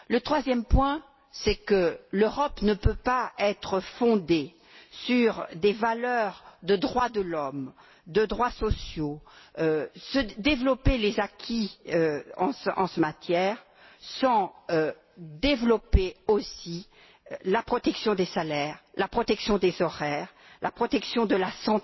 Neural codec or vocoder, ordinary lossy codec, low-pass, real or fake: none; MP3, 24 kbps; 7.2 kHz; real